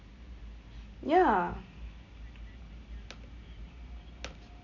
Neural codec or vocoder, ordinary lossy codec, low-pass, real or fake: none; none; 7.2 kHz; real